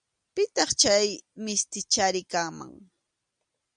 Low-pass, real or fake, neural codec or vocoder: 9.9 kHz; real; none